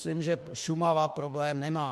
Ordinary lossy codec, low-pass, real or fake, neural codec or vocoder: MP3, 64 kbps; 14.4 kHz; fake; autoencoder, 48 kHz, 32 numbers a frame, DAC-VAE, trained on Japanese speech